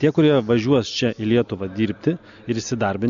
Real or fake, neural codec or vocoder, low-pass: real; none; 7.2 kHz